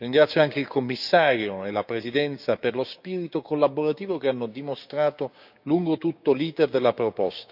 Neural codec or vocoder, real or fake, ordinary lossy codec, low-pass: codec, 16 kHz, 6 kbps, DAC; fake; none; 5.4 kHz